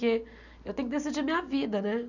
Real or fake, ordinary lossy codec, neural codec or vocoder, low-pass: real; none; none; 7.2 kHz